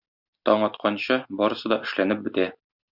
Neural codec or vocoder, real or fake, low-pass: none; real; 5.4 kHz